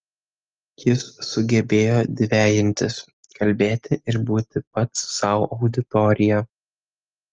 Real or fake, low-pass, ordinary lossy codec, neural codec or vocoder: real; 7.2 kHz; Opus, 24 kbps; none